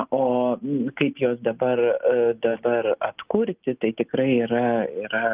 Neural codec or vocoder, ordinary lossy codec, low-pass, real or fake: none; Opus, 24 kbps; 3.6 kHz; real